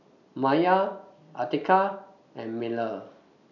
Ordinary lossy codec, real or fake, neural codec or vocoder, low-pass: none; real; none; 7.2 kHz